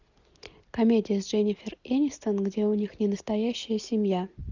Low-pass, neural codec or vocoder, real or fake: 7.2 kHz; none; real